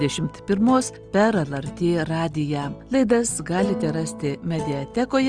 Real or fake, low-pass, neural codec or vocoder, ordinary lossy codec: real; 9.9 kHz; none; Opus, 24 kbps